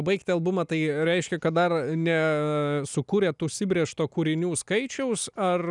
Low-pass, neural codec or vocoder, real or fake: 10.8 kHz; none; real